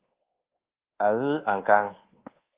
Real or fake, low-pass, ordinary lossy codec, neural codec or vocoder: fake; 3.6 kHz; Opus, 16 kbps; codec, 24 kHz, 1.2 kbps, DualCodec